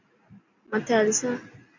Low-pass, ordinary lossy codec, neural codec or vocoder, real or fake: 7.2 kHz; MP3, 64 kbps; none; real